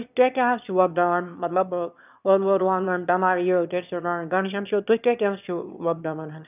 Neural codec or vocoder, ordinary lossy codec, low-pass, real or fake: autoencoder, 22.05 kHz, a latent of 192 numbers a frame, VITS, trained on one speaker; none; 3.6 kHz; fake